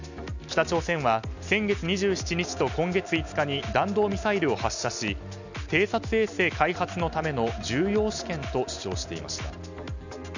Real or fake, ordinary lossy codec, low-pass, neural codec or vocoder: real; none; 7.2 kHz; none